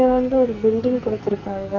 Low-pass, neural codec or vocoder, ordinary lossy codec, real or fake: 7.2 kHz; codec, 44.1 kHz, 2.6 kbps, SNAC; none; fake